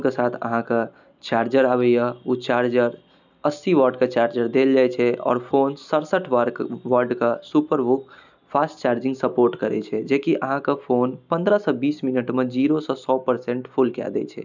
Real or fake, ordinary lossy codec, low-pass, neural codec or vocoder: real; none; 7.2 kHz; none